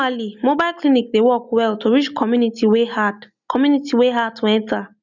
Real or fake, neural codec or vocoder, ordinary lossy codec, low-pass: real; none; none; 7.2 kHz